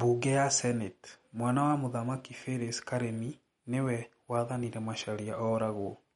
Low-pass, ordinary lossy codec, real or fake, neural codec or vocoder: 19.8 kHz; MP3, 48 kbps; real; none